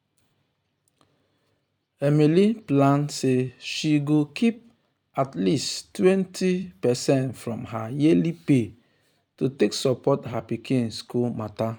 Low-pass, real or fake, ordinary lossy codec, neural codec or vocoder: 19.8 kHz; fake; none; vocoder, 44.1 kHz, 128 mel bands every 256 samples, BigVGAN v2